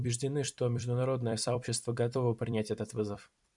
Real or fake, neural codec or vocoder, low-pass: fake; vocoder, 44.1 kHz, 128 mel bands every 256 samples, BigVGAN v2; 10.8 kHz